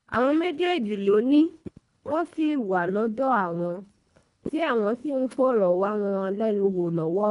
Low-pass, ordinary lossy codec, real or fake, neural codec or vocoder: 10.8 kHz; none; fake; codec, 24 kHz, 1.5 kbps, HILCodec